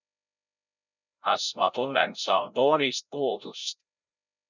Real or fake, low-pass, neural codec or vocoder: fake; 7.2 kHz; codec, 16 kHz, 0.5 kbps, FreqCodec, larger model